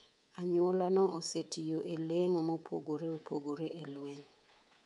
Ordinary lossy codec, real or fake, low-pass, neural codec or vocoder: none; fake; 10.8 kHz; codec, 24 kHz, 3.1 kbps, DualCodec